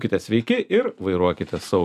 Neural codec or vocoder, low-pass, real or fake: none; 14.4 kHz; real